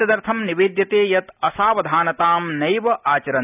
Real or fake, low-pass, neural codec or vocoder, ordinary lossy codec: real; 3.6 kHz; none; none